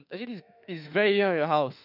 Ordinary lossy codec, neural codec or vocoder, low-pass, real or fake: none; autoencoder, 48 kHz, 32 numbers a frame, DAC-VAE, trained on Japanese speech; 5.4 kHz; fake